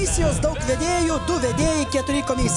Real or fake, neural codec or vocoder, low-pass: real; none; 10.8 kHz